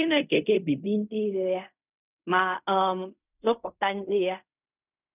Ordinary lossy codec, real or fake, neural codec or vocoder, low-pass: none; fake; codec, 16 kHz in and 24 kHz out, 0.4 kbps, LongCat-Audio-Codec, fine tuned four codebook decoder; 3.6 kHz